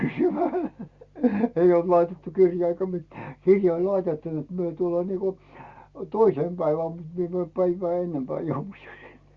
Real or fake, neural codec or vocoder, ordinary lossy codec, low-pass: real; none; Opus, 64 kbps; 7.2 kHz